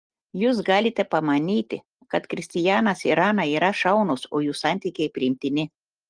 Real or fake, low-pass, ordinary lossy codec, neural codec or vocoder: real; 9.9 kHz; Opus, 24 kbps; none